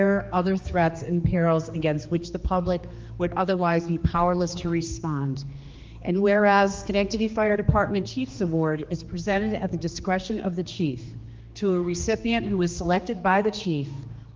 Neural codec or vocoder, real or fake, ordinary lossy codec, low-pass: codec, 16 kHz, 2 kbps, X-Codec, HuBERT features, trained on general audio; fake; Opus, 32 kbps; 7.2 kHz